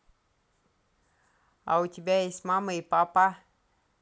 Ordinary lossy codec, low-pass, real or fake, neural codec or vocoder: none; none; real; none